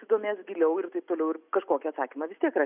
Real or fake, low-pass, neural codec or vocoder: real; 3.6 kHz; none